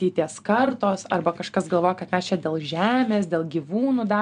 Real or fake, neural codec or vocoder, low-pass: real; none; 9.9 kHz